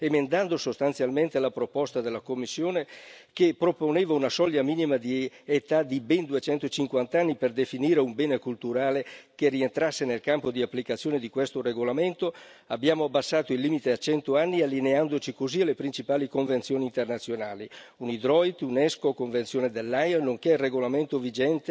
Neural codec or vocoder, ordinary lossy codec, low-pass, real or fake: none; none; none; real